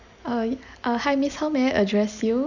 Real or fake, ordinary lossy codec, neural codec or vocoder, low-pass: real; none; none; 7.2 kHz